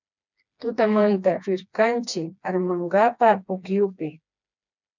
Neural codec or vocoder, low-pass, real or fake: codec, 16 kHz, 2 kbps, FreqCodec, smaller model; 7.2 kHz; fake